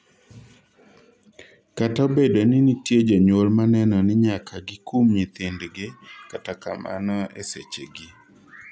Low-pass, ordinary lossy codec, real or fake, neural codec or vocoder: none; none; real; none